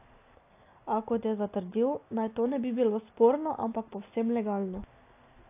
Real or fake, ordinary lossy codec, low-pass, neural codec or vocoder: real; AAC, 24 kbps; 3.6 kHz; none